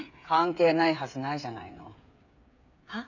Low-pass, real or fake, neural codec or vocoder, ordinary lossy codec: 7.2 kHz; fake; codec, 16 kHz, 8 kbps, FreqCodec, smaller model; none